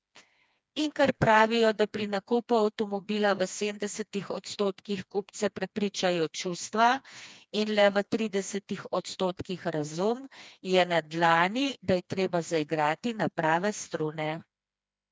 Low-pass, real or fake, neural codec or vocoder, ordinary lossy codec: none; fake; codec, 16 kHz, 2 kbps, FreqCodec, smaller model; none